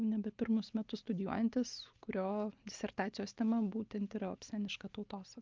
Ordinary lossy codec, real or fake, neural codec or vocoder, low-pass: Opus, 24 kbps; real; none; 7.2 kHz